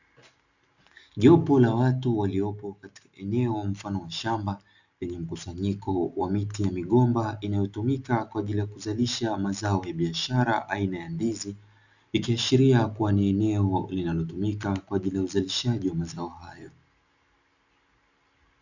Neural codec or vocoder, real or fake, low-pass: none; real; 7.2 kHz